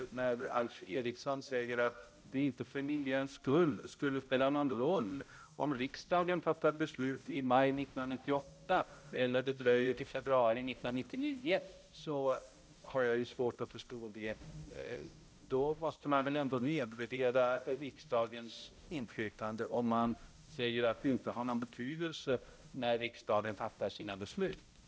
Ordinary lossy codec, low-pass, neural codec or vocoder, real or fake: none; none; codec, 16 kHz, 0.5 kbps, X-Codec, HuBERT features, trained on balanced general audio; fake